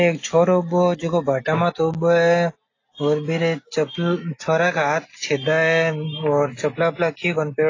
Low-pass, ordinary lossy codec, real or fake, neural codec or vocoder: 7.2 kHz; AAC, 32 kbps; real; none